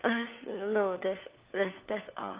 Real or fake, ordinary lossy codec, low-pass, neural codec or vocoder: fake; Opus, 32 kbps; 3.6 kHz; codec, 16 kHz, 4 kbps, FunCodec, trained on LibriTTS, 50 frames a second